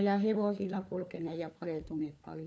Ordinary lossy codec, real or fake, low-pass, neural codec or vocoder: none; fake; none; codec, 16 kHz, 4 kbps, FunCodec, trained on LibriTTS, 50 frames a second